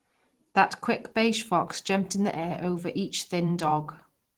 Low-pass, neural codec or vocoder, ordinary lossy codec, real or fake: 19.8 kHz; vocoder, 44.1 kHz, 128 mel bands every 512 samples, BigVGAN v2; Opus, 16 kbps; fake